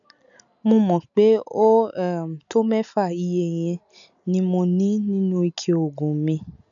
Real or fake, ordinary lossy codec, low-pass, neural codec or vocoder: real; none; 7.2 kHz; none